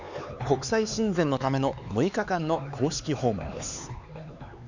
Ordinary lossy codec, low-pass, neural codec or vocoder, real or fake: none; 7.2 kHz; codec, 16 kHz, 4 kbps, X-Codec, HuBERT features, trained on LibriSpeech; fake